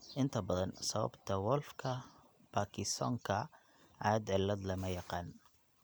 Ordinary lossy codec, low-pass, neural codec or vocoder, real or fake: none; none; none; real